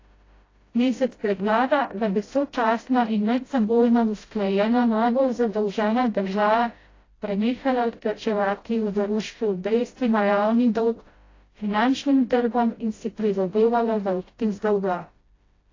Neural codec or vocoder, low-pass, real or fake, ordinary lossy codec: codec, 16 kHz, 0.5 kbps, FreqCodec, smaller model; 7.2 kHz; fake; AAC, 32 kbps